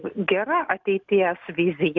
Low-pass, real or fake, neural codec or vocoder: 7.2 kHz; real; none